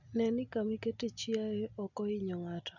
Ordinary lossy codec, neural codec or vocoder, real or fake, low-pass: none; none; real; 7.2 kHz